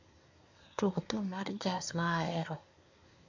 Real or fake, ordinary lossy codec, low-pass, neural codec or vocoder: fake; MP3, 48 kbps; 7.2 kHz; codec, 24 kHz, 1 kbps, SNAC